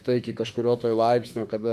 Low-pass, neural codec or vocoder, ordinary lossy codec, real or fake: 14.4 kHz; autoencoder, 48 kHz, 32 numbers a frame, DAC-VAE, trained on Japanese speech; Opus, 64 kbps; fake